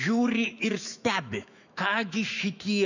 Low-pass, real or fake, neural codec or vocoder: 7.2 kHz; fake; autoencoder, 48 kHz, 128 numbers a frame, DAC-VAE, trained on Japanese speech